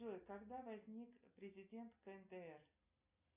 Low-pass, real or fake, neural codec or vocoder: 3.6 kHz; real; none